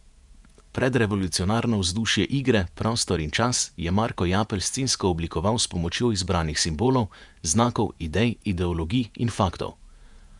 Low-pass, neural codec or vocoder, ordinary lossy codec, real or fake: 10.8 kHz; vocoder, 48 kHz, 128 mel bands, Vocos; none; fake